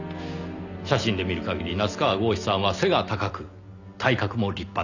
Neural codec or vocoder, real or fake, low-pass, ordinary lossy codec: none; real; 7.2 kHz; none